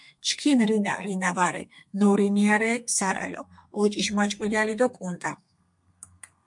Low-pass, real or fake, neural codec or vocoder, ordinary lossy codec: 10.8 kHz; fake; codec, 32 kHz, 1.9 kbps, SNAC; MP3, 64 kbps